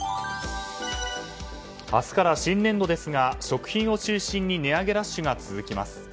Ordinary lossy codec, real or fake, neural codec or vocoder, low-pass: none; real; none; none